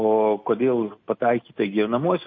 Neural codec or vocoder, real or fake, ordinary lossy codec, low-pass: none; real; MP3, 32 kbps; 7.2 kHz